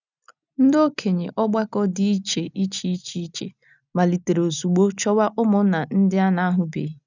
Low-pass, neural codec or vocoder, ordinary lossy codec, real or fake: 7.2 kHz; none; none; real